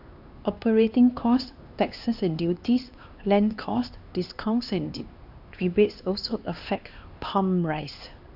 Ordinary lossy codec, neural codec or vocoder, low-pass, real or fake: AAC, 48 kbps; codec, 16 kHz, 2 kbps, X-Codec, HuBERT features, trained on LibriSpeech; 5.4 kHz; fake